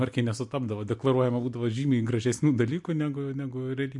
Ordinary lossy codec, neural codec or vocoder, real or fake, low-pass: MP3, 64 kbps; none; real; 10.8 kHz